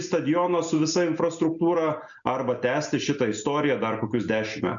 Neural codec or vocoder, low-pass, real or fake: none; 7.2 kHz; real